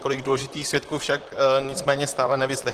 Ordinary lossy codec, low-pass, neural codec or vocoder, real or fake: Opus, 16 kbps; 14.4 kHz; vocoder, 44.1 kHz, 128 mel bands, Pupu-Vocoder; fake